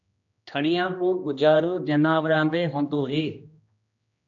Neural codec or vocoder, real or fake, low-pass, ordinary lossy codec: codec, 16 kHz, 1 kbps, X-Codec, HuBERT features, trained on general audio; fake; 7.2 kHz; AAC, 64 kbps